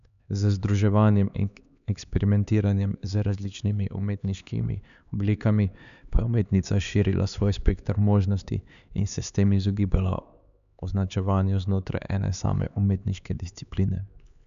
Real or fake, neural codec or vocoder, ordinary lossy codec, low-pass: fake; codec, 16 kHz, 4 kbps, X-Codec, HuBERT features, trained on LibriSpeech; none; 7.2 kHz